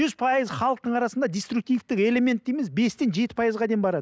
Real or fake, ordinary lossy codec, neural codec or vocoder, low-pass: real; none; none; none